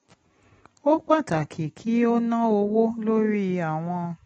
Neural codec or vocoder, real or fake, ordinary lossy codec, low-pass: none; real; AAC, 24 kbps; 19.8 kHz